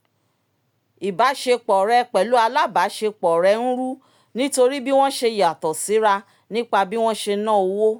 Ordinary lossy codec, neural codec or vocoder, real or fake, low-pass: none; none; real; none